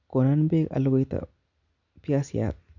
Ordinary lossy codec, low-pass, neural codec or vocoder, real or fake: none; 7.2 kHz; none; real